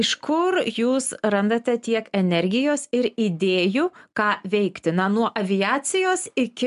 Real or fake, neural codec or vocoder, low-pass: real; none; 10.8 kHz